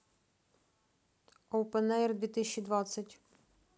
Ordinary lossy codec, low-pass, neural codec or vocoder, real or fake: none; none; none; real